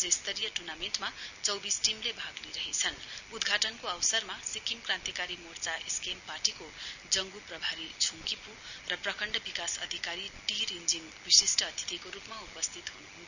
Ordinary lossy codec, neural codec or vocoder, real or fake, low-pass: none; none; real; 7.2 kHz